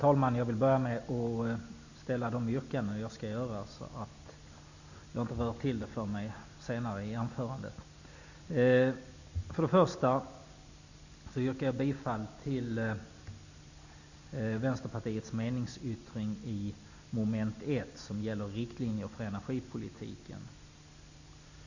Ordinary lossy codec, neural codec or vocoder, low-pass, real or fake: none; none; 7.2 kHz; real